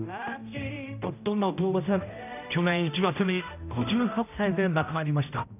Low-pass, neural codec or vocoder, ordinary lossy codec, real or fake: 3.6 kHz; codec, 16 kHz, 0.5 kbps, X-Codec, HuBERT features, trained on general audio; none; fake